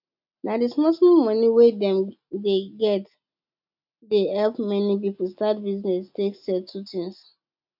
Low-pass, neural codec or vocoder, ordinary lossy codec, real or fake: 5.4 kHz; none; none; real